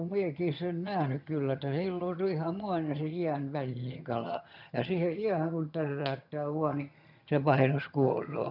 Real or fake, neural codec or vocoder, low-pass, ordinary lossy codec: fake; vocoder, 22.05 kHz, 80 mel bands, HiFi-GAN; 5.4 kHz; none